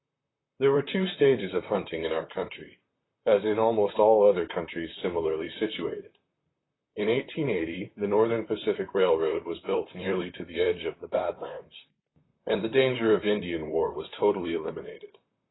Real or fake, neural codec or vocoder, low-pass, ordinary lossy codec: fake; vocoder, 44.1 kHz, 128 mel bands, Pupu-Vocoder; 7.2 kHz; AAC, 16 kbps